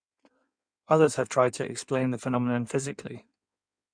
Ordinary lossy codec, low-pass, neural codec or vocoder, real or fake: none; 9.9 kHz; codec, 16 kHz in and 24 kHz out, 1.1 kbps, FireRedTTS-2 codec; fake